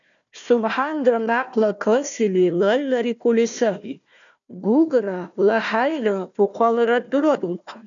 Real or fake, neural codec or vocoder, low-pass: fake; codec, 16 kHz, 1 kbps, FunCodec, trained on Chinese and English, 50 frames a second; 7.2 kHz